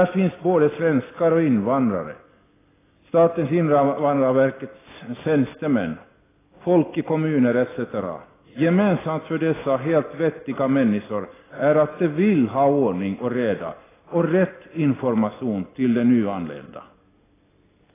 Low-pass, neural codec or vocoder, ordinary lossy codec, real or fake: 3.6 kHz; none; AAC, 16 kbps; real